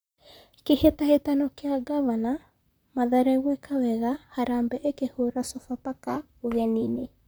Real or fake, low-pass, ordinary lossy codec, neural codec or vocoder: fake; none; none; vocoder, 44.1 kHz, 128 mel bands, Pupu-Vocoder